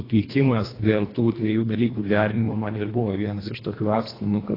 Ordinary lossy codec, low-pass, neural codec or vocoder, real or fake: AAC, 24 kbps; 5.4 kHz; codec, 24 kHz, 1.5 kbps, HILCodec; fake